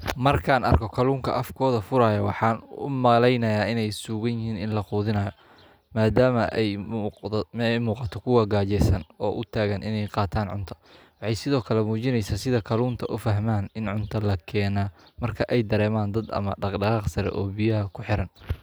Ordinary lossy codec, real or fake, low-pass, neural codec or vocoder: none; real; none; none